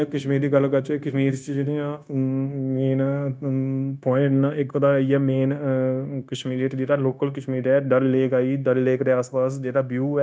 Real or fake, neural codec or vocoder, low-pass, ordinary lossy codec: fake; codec, 16 kHz, 0.9 kbps, LongCat-Audio-Codec; none; none